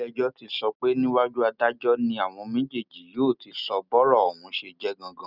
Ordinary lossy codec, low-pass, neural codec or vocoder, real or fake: none; 5.4 kHz; none; real